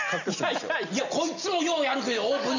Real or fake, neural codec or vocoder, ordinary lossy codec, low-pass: real; none; none; 7.2 kHz